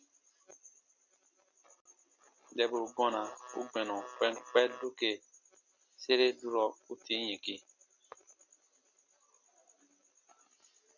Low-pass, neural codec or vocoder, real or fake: 7.2 kHz; none; real